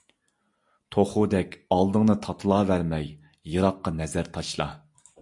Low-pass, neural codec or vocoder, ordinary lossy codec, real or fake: 10.8 kHz; none; AAC, 64 kbps; real